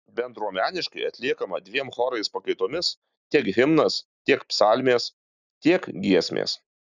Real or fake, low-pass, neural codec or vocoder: real; 7.2 kHz; none